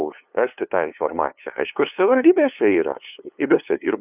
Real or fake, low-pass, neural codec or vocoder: fake; 3.6 kHz; codec, 16 kHz, 2 kbps, FunCodec, trained on LibriTTS, 25 frames a second